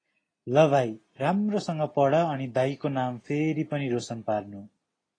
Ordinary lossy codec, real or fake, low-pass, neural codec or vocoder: AAC, 32 kbps; real; 9.9 kHz; none